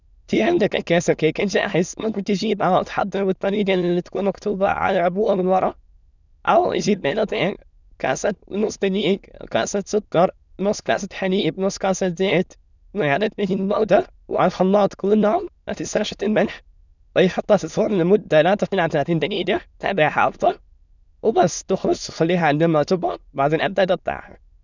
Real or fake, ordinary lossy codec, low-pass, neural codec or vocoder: fake; none; 7.2 kHz; autoencoder, 22.05 kHz, a latent of 192 numbers a frame, VITS, trained on many speakers